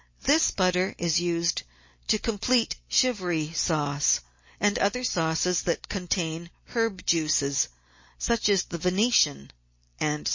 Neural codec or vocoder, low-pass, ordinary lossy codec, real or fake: none; 7.2 kHz; MP3, 32 kbps; real